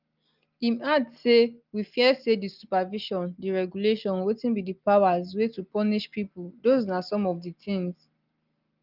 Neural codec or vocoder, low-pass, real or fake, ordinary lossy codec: none; 5.4 kHz; real; Opus, 24 kbps